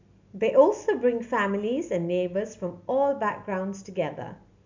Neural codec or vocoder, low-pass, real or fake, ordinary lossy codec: none; 7.2 kHz; real; MP3, 64 kbps